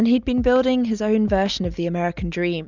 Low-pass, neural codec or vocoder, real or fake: 7.2 kHz; none; real